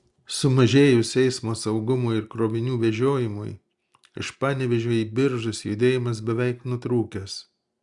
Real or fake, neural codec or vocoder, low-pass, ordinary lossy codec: real; none; 10.8 kHz; Opus, 64 kbps